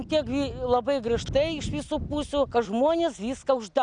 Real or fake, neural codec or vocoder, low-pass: real; none; 9.9 kHz